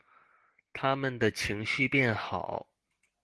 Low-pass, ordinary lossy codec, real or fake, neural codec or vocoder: 10.8 kHz; Opus, 16 kbps; real; none